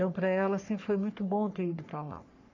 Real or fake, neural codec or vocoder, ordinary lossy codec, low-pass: fake; codec, 44.1 kHz, 3.4 kbps, Pupu-Codec; none; 7.2 kHz